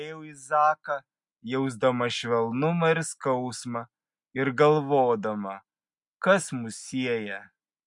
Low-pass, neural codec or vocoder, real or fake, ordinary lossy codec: 10.8 kHz; none; real; MP3, 64 kbps